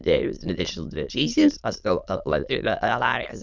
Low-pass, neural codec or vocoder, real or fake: 7.2 kHz; autoencoder, 22.05 kHz, a latent of 192 numbers a frame, VITS, trained on many speakers; fake